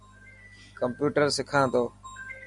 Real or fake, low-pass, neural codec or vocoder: real; 10.8 kHz; none